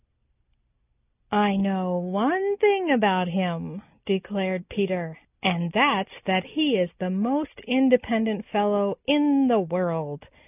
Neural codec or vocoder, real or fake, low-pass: none; real; 3.6 kHz